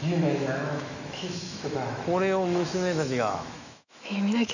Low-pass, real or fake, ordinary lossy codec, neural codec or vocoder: 7.2 kHz; fake; none; autoencoder, 48 kHz, 128 numbers a frame, DAC-VAE, trained on Japanese speech